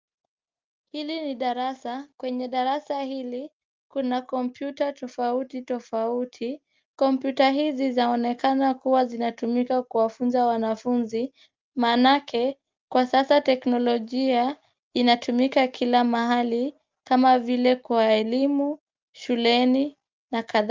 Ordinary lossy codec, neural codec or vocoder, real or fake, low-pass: Opus, 24 kbps; none; real; 7.2 kHz